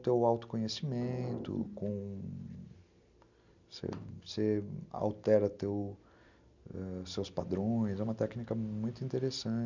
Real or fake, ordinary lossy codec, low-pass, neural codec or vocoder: real; none; 7.2 kHz; none